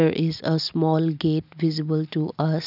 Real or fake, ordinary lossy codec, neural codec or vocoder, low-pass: real; none; none; 5.4 kHz